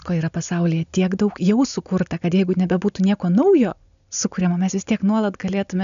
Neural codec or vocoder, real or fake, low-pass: none; real; 7.2 kHz